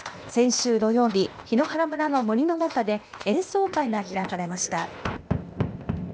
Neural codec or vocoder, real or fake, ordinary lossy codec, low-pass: codec, 16 kHz, 0.8 kbps, ZipCodec; fake; none; none